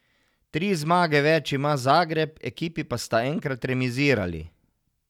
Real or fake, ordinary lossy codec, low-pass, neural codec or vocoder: real; none; 19.8 kHz; none